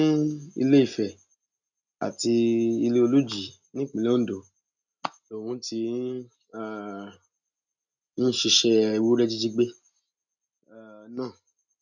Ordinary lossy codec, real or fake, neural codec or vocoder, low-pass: none; real; none; 7.2 kHz